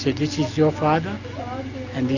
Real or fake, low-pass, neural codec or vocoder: real; 7.2 kHz; none